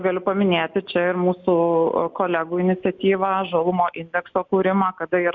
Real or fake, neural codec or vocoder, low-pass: real; none; 7.2 kHz